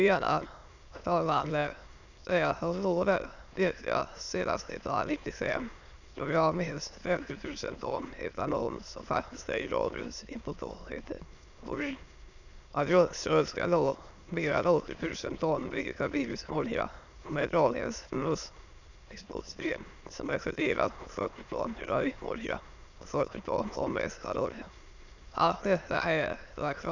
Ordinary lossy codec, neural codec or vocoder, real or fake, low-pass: none; autoencoder, 22.05 kHz, a latent of 192 numbers a frame, VITS, trained on many speakers; fake; 7.2 kHz